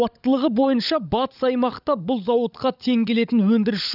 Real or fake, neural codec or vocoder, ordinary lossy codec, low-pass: fake; codec, 16 kHz, 16 kbps, FunCodec, trained on LibriTTS, 50 frames a second; none; 5.4 kHz